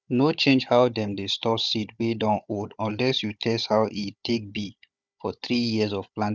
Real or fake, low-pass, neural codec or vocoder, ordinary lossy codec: fake; none; codec, 16 kHz, 16 kbps, FunCodec, trained on Chinese and English, 50 frames a second; none